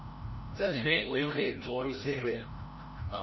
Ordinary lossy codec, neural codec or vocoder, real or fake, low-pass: MP3, 24 kbps; codec, 16 kHz, 0.5 kbps, FreqCodec, larger model; fake; 7.2 kHz